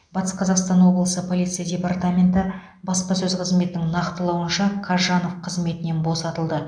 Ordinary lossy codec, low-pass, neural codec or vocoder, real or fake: MP3, 96 kbps; 9.9 kHz; none; real